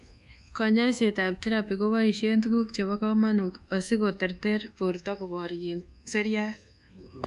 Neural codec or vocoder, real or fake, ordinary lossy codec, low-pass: codec, 24 kHz, 1.2 kbps, DualCodec; fake; none; 10.8 kHz